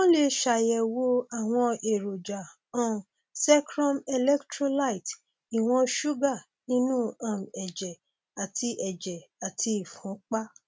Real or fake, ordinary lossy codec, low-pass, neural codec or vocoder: real; none; none; none